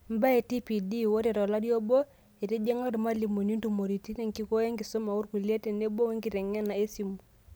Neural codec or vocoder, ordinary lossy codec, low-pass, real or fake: none; none; none; real